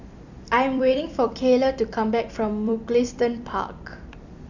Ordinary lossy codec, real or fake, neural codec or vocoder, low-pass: none; fake; vocoder, 44.1 kHz, 128 mel bands every 256 samples, BigVGAN v2; 7.2 kHz